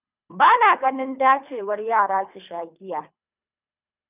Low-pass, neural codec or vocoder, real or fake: 3.6 kHz; codec, 24 kHz, 3 kbps, HILCodec; fake